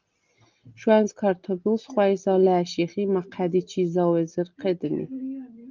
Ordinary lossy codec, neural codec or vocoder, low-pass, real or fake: Opus, 24 kbps; none; 7.2 kHz; real